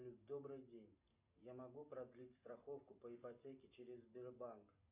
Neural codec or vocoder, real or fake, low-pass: none; real; 3.6 kHz